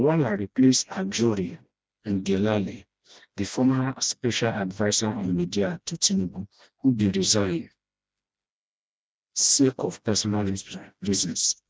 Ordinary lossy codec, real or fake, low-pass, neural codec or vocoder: none; fake; none; codec, 16 kHz, 1 kbps, FreqCodec, smaller model